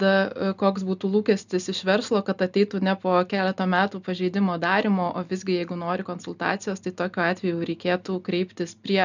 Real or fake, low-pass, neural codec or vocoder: real; 7.2 kHz; none